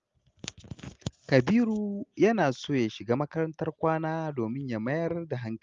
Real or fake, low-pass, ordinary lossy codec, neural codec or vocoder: real; 7.2 kHz; Opus, 32 kbps; none